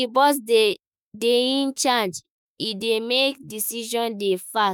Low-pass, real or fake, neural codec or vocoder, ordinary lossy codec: none; fake; autoencoder, 48 kHz, 32 numbers a frame, DAC-VAE, trained on Japanese speech; none